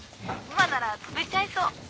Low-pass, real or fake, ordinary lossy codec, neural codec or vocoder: none; real; none; none